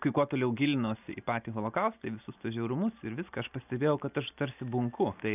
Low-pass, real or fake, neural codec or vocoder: 3.6 kHz; real; none